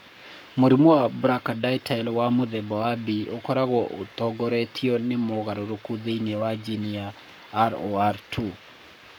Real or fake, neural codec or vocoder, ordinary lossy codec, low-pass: fake; codec, 44.1 kHz, 7.8 kbps, Pupu-Codec; none; none